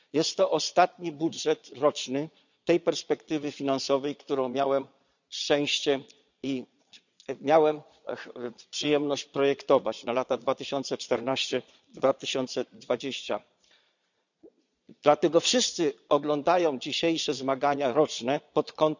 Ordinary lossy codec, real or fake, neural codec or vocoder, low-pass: none; fake; vocoder, 22.05 kHz, 80 mel bands, Vocos; 7.2 kHz